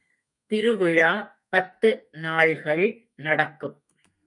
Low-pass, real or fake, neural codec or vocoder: 10.8 kHz; fake; codec, 32 kHz, 1.9 kbps, SNAC